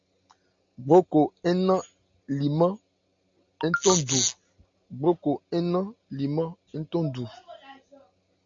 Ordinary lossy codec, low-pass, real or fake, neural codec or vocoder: AAC, 64 kbps; 7.2 kHz; real; none